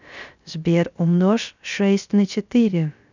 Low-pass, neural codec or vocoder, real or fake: 7.2 kHz; codec, 16 kHz, 0.3 kbps, FocalCodec; fake